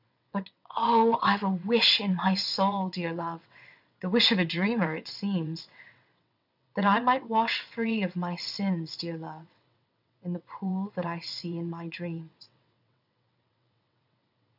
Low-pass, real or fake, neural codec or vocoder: 5.4 kHz; fake; vocoder, 22.05 kHz, 80 mel bands, WaveNeXt